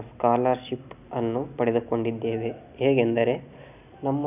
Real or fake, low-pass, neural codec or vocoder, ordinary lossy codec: real; 3.6 kHz; none; none